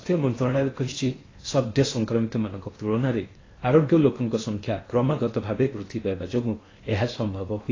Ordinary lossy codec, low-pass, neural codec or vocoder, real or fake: AAC, 32 kbps; 7.2 kHz; codec, 16 kHz in and 24 kHz out, 0.8 kbps, FocalCodec, streaming, 65536 codes; fake